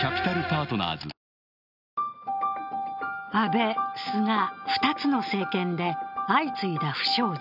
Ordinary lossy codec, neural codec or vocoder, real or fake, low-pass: none; none; real; 5.4 kHz